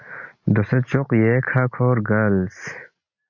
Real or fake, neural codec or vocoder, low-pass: real; none; 7.2 kHz